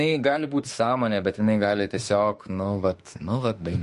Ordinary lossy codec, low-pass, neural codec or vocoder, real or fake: MP3, 48 kbps; 14.4 kHz; autoencoder, 48 kHz, 32 numbers a frame, DAC-VAE, trained on Japanese speech; fake